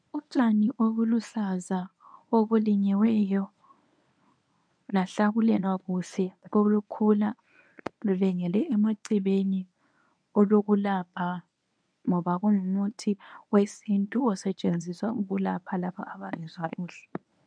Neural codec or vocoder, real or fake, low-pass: codec, 24 kHz, 0.9 kbps, WavTokenizer, medium speech release version 1; fake; 9.9 kHz